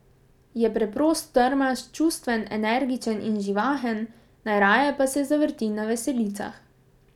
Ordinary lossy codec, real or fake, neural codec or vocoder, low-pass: none; real; none; 19.8 kHz